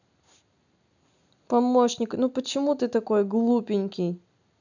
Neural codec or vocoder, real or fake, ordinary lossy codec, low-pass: none; real; none; 7.2 kHz